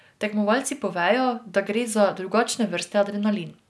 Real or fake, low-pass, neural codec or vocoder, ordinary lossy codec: real; none; none; none